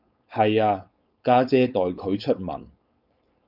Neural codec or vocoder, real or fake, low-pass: codec, 16 kHz, 4.8 kbps, FACodec; fake; 5.4 kHz